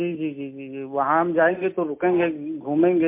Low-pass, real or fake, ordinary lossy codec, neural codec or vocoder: 3.6 kHz; real; MP3, 24 kbps; none